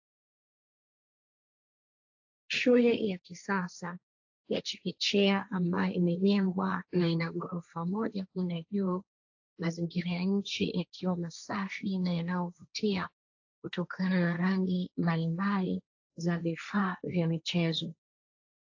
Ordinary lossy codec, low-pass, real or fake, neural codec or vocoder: AAC, 48 kbps; 7.2 kHz; fake; codec, 16 kHz, 1.1 kbps, Voila-Tokenizer